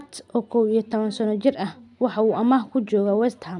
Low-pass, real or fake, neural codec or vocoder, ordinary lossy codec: 10.8 kHz; real; none; none